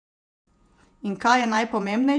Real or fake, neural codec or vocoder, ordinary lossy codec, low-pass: fake; vocoder, 48 kHz, 128 mel bands, Vocos; none; 9.9 kHz